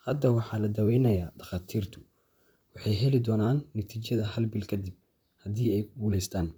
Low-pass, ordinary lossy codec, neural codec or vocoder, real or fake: none; none; vocoder, 44.1 kHz, 128 mel bands, Pupu-Vocoder; fake